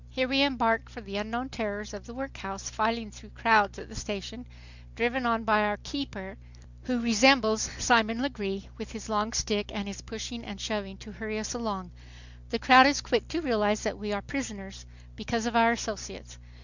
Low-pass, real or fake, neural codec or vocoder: 7.2 kHz; real; none